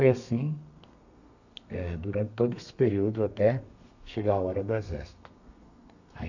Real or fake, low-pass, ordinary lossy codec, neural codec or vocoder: fake; 7.2 kHz; none; codec, 32 kHz, 1.9 kbps, SNAC